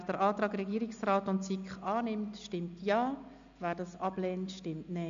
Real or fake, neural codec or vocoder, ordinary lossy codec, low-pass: real; none; AAC, 96 kbps; 7.2 kHz